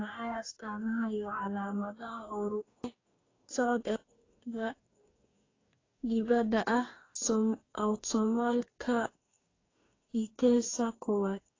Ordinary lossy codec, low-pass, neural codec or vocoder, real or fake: AAC, 32 kbps; 7.2 kHz; codec, 44.1 kHz, 2.6 kbps, DAC; fake